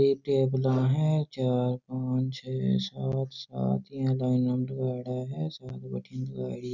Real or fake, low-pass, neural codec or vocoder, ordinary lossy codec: real; 7.2 kHz; none; none